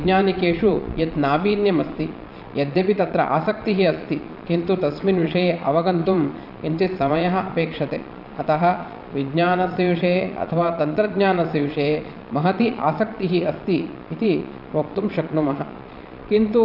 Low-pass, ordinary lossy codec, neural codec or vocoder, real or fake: 5.4 kHz; none; vocoder, 22.05 kHz, 80 mel bands, Vocos; fake